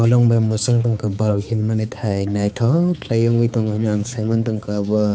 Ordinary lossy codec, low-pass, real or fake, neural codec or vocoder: none; none; fake; codec, 16 kHz, 4 kbps, X-Codec, HuBERT features, trained on balanced general audio